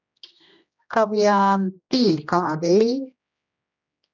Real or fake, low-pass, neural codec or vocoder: fake; 7.2 kHz; codec, 16 kHz, 1 kbps, X-Codec, HuBERT features, trained on general audio